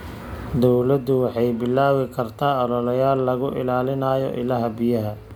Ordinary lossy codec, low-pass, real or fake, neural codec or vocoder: none; none; real; none